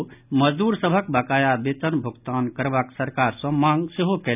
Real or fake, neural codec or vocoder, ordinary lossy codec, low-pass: real; none; none; 3.6 kHz